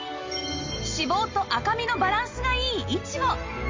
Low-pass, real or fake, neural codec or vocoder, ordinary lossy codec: 7.2 kHz; real; none; Opus, 32 kbps